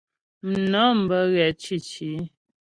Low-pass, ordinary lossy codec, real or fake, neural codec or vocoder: 9.9 kHz; Opus, 64 kbps; real; none